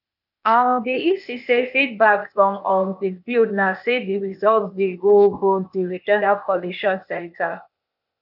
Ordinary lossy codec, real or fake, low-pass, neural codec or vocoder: none; fake; 5.4 kHz; codec, 16 kHz, 0.8 kbps, ZipCodec